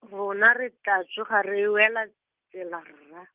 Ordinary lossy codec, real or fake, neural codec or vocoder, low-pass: Opus, 32 kbps; real; none; 3.6 kHz